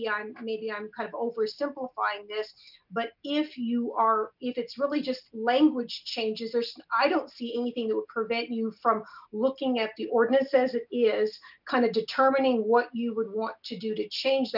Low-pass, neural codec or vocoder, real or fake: 5.4 kHz; none; real